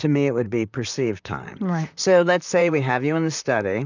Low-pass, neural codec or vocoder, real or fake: 7.2 kHz; vocoder, 44.1 kHz, 128 mel bands, Pupu-Vocoder; fake